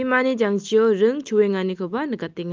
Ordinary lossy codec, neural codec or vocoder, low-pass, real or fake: Opus, 24 kbps; none; 7.2 kHz; real